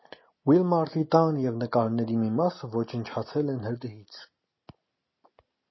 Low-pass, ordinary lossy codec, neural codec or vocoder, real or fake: 7.2 kHz; MP3, 24 kbps; none; real